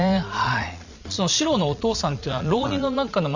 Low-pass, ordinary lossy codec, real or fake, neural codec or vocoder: 7.2 kHz; none; fake; vocoder, 22.05 kHz, 80 mel bands, Vocos